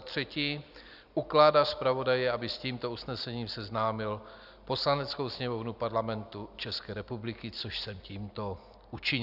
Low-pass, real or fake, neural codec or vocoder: 5.4 kHz; real; none